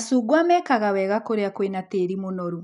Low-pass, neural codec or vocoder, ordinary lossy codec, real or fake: 10.8 kHz; none; none; real